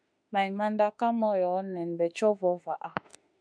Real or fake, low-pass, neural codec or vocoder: fake; 9.9 kHz; autoencoder, 48 kHz, 32 numbers a frame, DAC-VAE, trained on Japanese speech